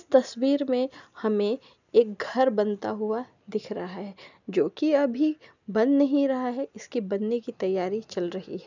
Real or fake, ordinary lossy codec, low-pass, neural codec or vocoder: real; none; 7.2 kHz; none